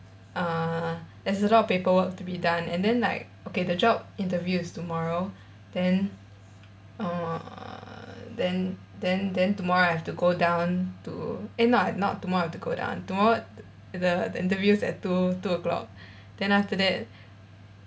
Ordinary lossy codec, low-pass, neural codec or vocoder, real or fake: none; none; none; real